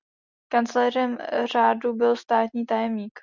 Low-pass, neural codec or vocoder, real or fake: 7.2 kHz; none; real